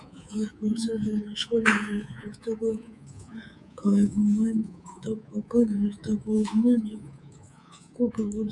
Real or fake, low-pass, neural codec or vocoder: fake; 10.8 kHz; codec, 24 kHz, 3.1 kbps, DualCodec